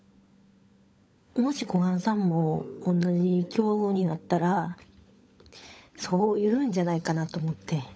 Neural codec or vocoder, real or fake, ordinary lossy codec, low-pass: codec, 16 kHz, 16 kbps, FunCodec, trained on LibriTTS, 50 frames a second; fake; none; none